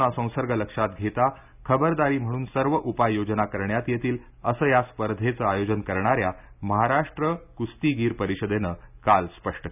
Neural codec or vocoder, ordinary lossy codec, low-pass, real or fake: none; none; 3.6 kHz; real